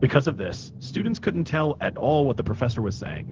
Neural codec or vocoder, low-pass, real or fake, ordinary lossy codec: codec, 16 kHz, 0.4 kbps, LongCat-Audio-Codec; 7.2 kHz; fake; Opus, 16 kbps